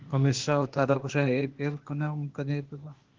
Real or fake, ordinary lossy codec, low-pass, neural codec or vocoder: fake; Opus, 16 kbps; 7.2 kHz; codec, 16 kHz, 0.8 kbps, ZipCodec